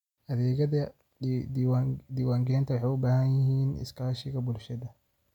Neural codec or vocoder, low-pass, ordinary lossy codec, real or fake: none; 19.8 kHz; none; real